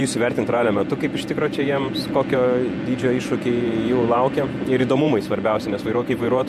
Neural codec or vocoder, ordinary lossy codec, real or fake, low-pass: vocoder, 48 kHz, 128 mel bands, Vocos; MP3, 64 kbps; fake; 14.4 kHz